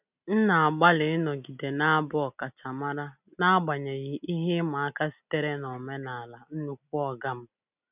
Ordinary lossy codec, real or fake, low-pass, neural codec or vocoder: none; real; 3.6 kHz; none